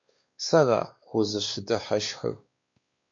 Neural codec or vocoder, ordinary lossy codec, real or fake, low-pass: codec, 16 kHz, 2 kbps, X-Codec, WavLM features, trained on Multilingual LibriSpeech; MP3, 48 kbps; fake; 7.2 kHz